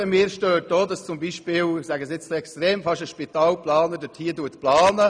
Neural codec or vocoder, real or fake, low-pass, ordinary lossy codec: none; real; 9.9 kHz; none